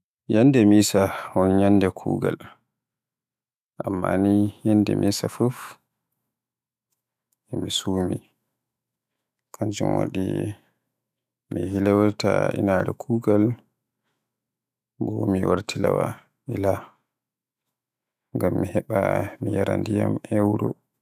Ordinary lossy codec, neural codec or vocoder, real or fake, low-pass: none; autoencoder, 48 kHz, 128 numbers a frame, DAC-VAE, trained on Japanese speech; fake; 14.4 kHz